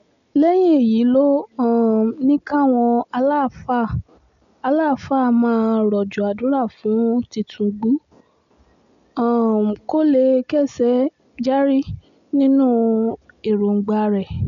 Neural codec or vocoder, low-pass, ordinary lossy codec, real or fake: none; 7.2 kHz; none; real